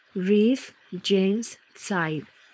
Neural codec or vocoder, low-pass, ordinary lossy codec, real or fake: codec, 16 kHz, 4.8 kbps, FACodec; none; none; fake